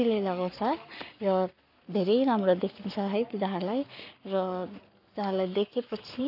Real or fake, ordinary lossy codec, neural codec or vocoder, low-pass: fake; none; codec, 44.1 kHz, 7.8 kbps, Pupu-Codec; 5.4 kHz